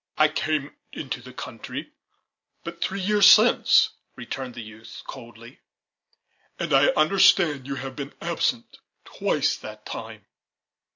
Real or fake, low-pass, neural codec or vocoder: real; 7.2 kHz; none